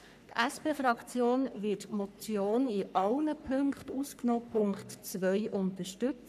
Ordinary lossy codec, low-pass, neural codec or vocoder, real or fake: none; 14.4 kHz; codec, 44.1 kHz, 3.4 kbps, Pupu-Codec; fake